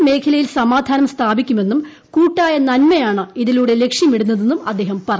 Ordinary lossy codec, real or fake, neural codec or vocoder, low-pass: none; real; none; none